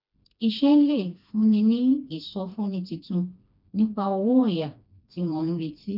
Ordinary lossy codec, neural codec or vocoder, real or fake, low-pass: none; codec, 16 kHz, 2 kbps, FreqCodec, smaller model; fake; 5.4 kHz